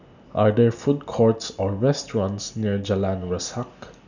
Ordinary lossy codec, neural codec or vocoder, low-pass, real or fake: none; none; 7.2 kHz; real